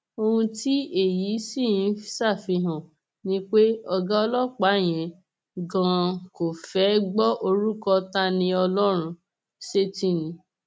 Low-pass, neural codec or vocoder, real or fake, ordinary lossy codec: none; none; real; none